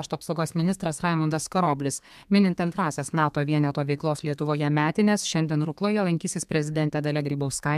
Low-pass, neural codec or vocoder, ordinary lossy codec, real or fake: 14.4 kHz; codec, 32 kHz, 1.9 kbps, SNAC; MP3, 96 kbps; fake